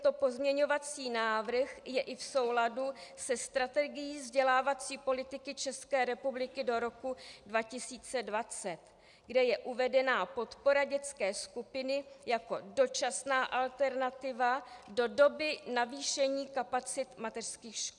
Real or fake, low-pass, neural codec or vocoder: real; 10.8 kHz; none